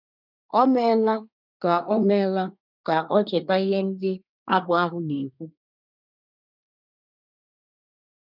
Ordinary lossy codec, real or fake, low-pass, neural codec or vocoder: none; fake; 5.4 kHz; codec, 24 kHz, 1 kbps, SNAC